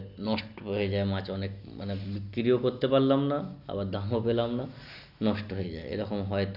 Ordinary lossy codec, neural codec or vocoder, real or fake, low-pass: none; none; real; 5.4 kHz